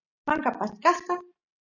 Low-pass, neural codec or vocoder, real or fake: 7.2 kHz; none; real